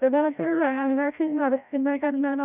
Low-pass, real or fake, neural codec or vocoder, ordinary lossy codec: 3.6 kHz; fake; codec, 16 kHz, 0.5 kbps, FreqCodec, larger model; none